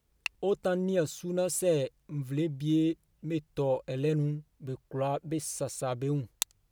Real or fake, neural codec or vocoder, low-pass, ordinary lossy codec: real; none; none; none